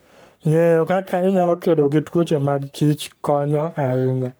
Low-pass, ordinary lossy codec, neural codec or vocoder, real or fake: none; none; codec, 44.1 kHz, 3.4 kbps, Pupu-Codec; fake